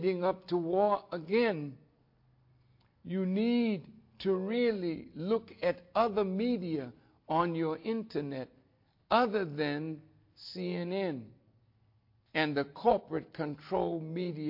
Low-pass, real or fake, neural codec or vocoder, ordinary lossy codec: 5.4 kHz; real; none; MP3, 32 kbps